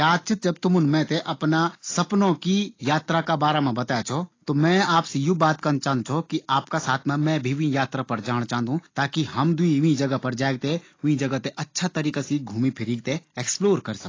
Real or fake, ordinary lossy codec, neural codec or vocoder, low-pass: real; AAC, 32 kbps; none; 7.2 kHz